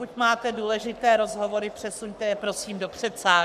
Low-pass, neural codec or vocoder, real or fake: 14.4 kHz; codec, 44.1 kHz, 7.8 kbps, Pupu-Codec; fake